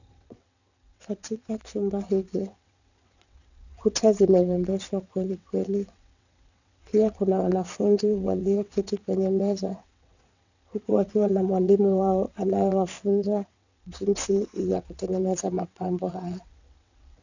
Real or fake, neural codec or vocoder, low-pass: fake; vocoder, 22.05 kHz, 80 mel bands, WaveNeXt; 7.2 kHz